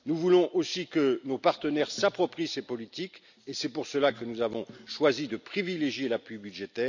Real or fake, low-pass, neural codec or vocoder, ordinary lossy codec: real; 7.2 kHz; none; none